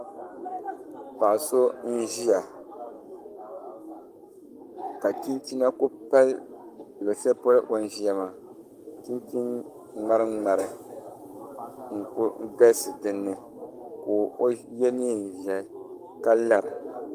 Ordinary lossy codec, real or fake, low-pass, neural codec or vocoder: Opus, 32 kbps; fake; 14.4 kHz; codec, 44.1 kHz, 7.8 kbps, Pupu-Codec